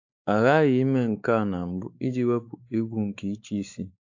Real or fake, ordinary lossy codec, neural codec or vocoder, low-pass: fake; AAC, 48 kbps; codec, 16 kHz, 4 kbps, X-Codec, WavLM features, trained on Multilingual LibriSpeech; 7.2 kHz